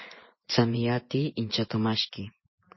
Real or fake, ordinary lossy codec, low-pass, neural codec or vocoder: fake; MP3, 24 kbps; 7.2 kHz; vocoder, 24 kHz, 100 mel bands, Vocos